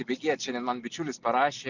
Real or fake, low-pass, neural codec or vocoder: real; 7.2 kHz; none